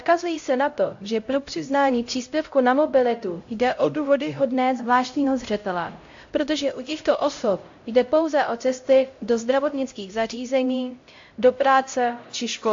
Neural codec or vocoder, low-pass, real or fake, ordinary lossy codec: codec, 16 kHz, 0.5 kbps, X-Codec, HuBERT features, trained on LibriSpeech; 7.2 kHz; fake; AAC, 48 kbps